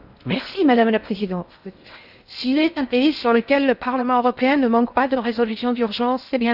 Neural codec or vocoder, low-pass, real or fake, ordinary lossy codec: codec, 16 kHz in and 24 kHz out, 0.6 kbps, FocalCodec, streaming, 4096 codes; 5.4 kHz; fake; none